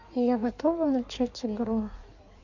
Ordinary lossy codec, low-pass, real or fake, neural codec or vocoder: none; 7.2 kHz; fake; codec, 16 kHz in and 24 kHz out, 1.1 kbps, FireRedTTS-2 codec